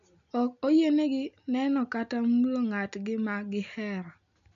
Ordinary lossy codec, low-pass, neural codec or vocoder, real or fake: none; 7.2 kHz; none; real